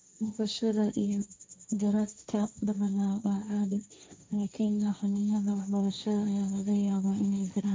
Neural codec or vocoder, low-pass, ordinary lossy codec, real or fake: codec, 16 kHz, 1.1 kbps, Voila-Tokenizer; none; none; fake